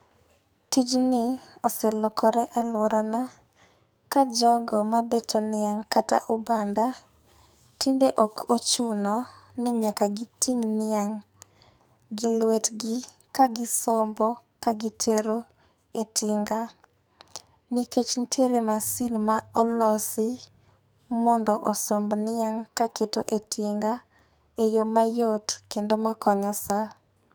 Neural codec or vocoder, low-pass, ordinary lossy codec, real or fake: codec, 44.1 kHz, 2.6 kbps, SNAC; none; none; fake